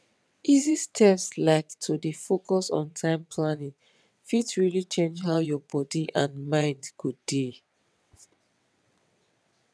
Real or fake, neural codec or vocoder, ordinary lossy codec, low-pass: fake; vocoder, 22.05 kHz, 80 mel bands, WaveNeXt; none; none